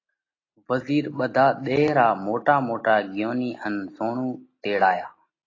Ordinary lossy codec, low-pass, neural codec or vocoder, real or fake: AAC, 32 kbps; 7.2 kHz; none; real